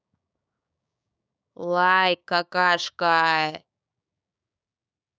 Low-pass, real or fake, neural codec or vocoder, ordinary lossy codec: none; fake; codec, 16 kHz, 6 kbps, DAC; none